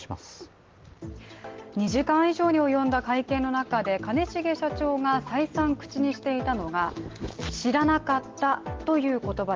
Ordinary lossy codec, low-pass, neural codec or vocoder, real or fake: Opus, 16 kbps; 7.2 kHz; none; real